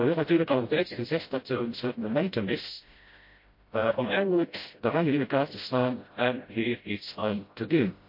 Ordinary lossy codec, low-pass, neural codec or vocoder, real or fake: MP3, 32 kbps; 5.4 kHz; codec, 16 kHz, 0.5 kbps, FreqCodec, smaller model; fake